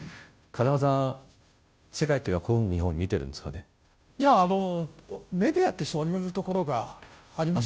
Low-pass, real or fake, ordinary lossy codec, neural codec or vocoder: none; fake; none; codec, 16 kHz, 0.5 kbps, FunCodec, trained on Chinese and English, 25 frames a second